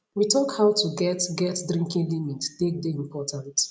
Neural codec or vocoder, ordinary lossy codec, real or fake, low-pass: none; none; real; none